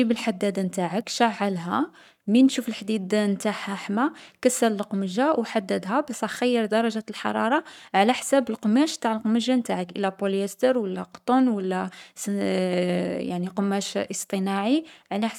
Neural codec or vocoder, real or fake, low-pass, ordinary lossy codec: codec, 44.1 kHz, 7.8 kbps, Pupu-Codec; fake; 19.8 kHz; none